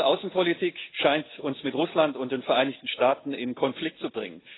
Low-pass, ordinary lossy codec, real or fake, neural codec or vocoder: 7.2 kHz; AAC, 16 kbps; real; none